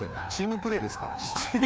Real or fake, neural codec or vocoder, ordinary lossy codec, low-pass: fake; codec, 16 kHz, 2 kbps, FreqCodec, larger model; none; none